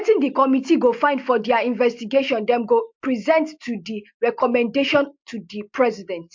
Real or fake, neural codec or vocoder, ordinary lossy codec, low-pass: real; none; MP3, 48 kbps; 7.2 kHz